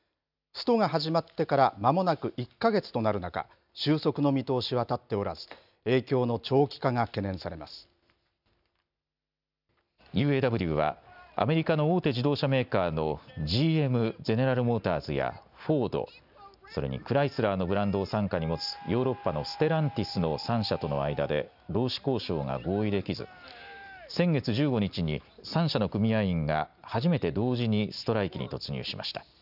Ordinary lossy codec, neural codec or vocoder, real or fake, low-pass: none; none; real; 5.4 kHz